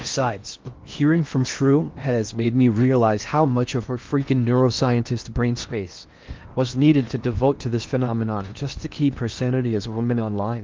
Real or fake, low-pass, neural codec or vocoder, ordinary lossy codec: fake; 7.2 kHz; codec, 16 kHz in and 24 kHz out, 0.8 kbps, FocalCodec, streaming, 65536 codes; Opus, 32 kbps